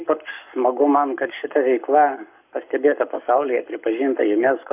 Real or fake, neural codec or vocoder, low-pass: fake; codec, 44.1 kHz, 7.8 kbps, Pupu-Codec; 3.6 kHz